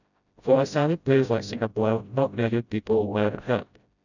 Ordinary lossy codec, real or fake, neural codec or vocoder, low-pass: none; fake; codec, 16 kHz, 0.5 kbps, FreqCodec, smaller model; 7.2 kHz